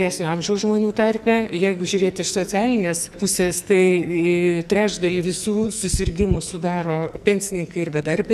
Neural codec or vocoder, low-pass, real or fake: codec, 44.1 kHz, 2.6 kbps, SNAC; 14.4 kHz; fake